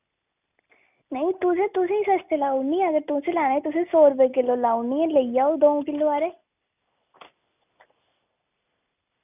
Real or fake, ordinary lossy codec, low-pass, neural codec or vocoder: real; none; 3.6 kHz; none